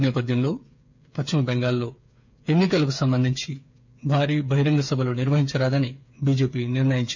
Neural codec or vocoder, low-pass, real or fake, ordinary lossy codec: codec, 16 kHz, 8 kbps, FreqCodec, smaller model; 7.2 kHz; fake; none